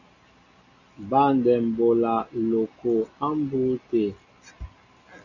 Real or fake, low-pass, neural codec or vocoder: real; 7.2 kHz; none